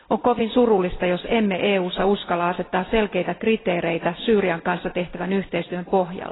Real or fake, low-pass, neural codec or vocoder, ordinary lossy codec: real; 7.2 kHz; none; AAC, 16 kbps